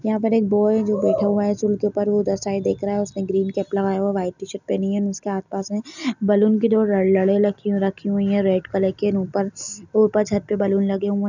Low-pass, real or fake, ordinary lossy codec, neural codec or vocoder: 7.2 kHz; real; none; none